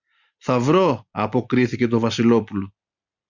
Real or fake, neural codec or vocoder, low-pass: real; none; 7.2 kHz